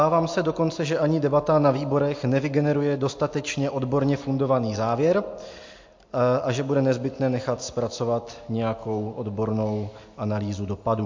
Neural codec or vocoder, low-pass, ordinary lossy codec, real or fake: none; 7.2 kHz; MP3, 48 kbps; real